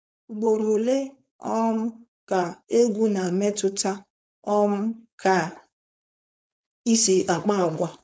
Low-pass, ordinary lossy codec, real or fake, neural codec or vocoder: none; none; fake; codec, 16 kHz, 4.8 kbps, FACodec